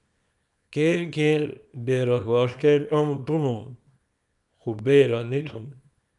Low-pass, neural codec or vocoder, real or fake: 10.8 kHz; codec, 24 kHz, 0.9 kbps, WavTokenizer, small release; fake